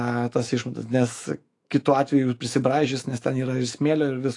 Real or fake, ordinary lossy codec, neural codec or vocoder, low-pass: fake; AAC, 48 kbps; autoencoder, 48 kHz, 128 numbers a frame, DAC-VAE, trained on Japanese speech; 10.8 kHz